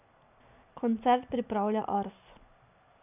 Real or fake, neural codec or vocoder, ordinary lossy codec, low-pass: real; none; none; 3.6 kHz